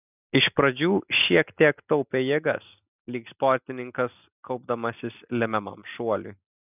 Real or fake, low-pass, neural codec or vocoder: real; 3.6 kHz; none